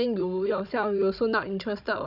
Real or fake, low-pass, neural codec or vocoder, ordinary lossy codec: fake; 5.4 kHz; codec, 16 kHz, 16 kbps, FunCodec, trained on Chinese and English, 50 frames a second; none